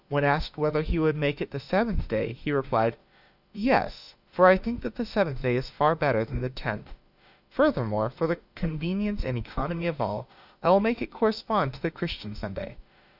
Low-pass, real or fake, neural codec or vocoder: 5.4 kHz; fake; autoencoder, 48 kHz, 32 numbers a frame, DAC-VAE, trained on Japanese speech